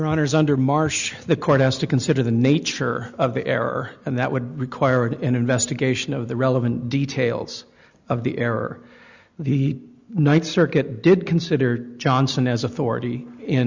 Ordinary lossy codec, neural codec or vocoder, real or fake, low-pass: Opus, 64 kbps; none; real; 7.2 kHz